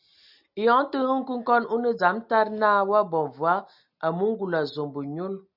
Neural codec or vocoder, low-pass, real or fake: none; 5.4 kHz; real